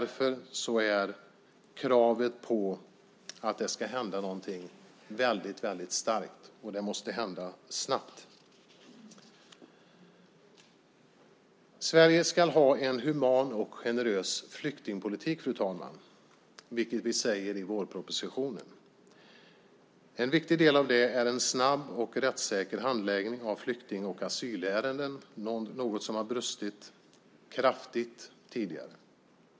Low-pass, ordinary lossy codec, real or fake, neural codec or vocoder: none; none; real; none